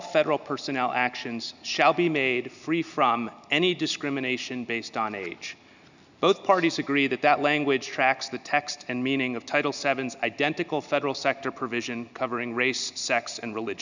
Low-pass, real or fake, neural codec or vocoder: 7.2 kHz; real; none